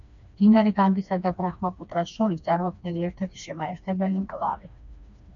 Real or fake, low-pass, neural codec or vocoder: fake; 7.2 kHz; codec, 16 kHz, 2 kbps, FreqCodec, smaller model